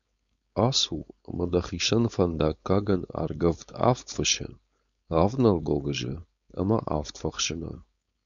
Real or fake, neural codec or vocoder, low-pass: fake; codec, 16 kHz, 4.8 kbps, FACodec; 7.2 kHz